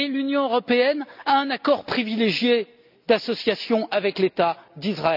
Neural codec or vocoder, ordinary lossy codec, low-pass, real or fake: none; none; 5.4 kHz; real